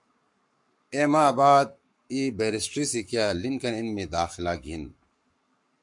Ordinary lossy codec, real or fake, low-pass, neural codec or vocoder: MP3, 64 kbps; fake; 10.8 kHz; codec, 44.1 kHz, 7.8 kbps, Pupu-Codec